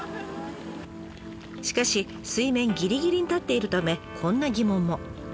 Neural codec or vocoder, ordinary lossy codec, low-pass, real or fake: none; none; none; real